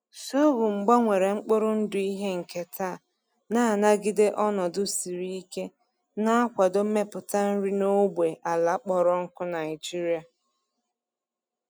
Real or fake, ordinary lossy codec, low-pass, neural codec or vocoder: real; none; none; none